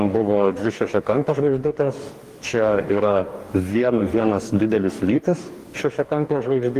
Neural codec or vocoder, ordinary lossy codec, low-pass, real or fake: codec, 44.1 kHz, 2.6 kbps, DAC; Opus, 24 kbps; 14.4 kHz; fake